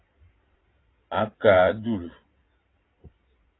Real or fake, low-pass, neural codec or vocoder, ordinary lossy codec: fake; 7.2 kHz; vocoder, 22.05 kHz, 80 mel bands, Vocos; AAC, 16 kbps